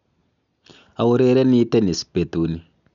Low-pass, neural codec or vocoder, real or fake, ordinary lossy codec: 7.2 kHz; none; real; none